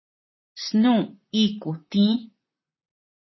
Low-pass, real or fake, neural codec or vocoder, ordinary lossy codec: 7.2 kHz; fake; vocoder, 44.1 kHz, 128 mel bands, Pupu-Vocoder; MP3, 24 kbps